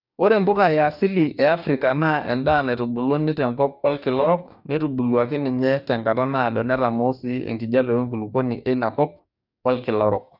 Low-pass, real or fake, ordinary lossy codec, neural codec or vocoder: 5.4 kHz; fake; none; codec, 44.1 kHz, 2.6 kbps, DAC